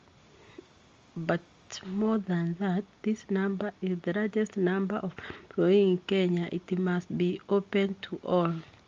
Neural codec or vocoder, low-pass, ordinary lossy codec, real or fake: none; 7.2 kHz; Opus, 32 kbps; real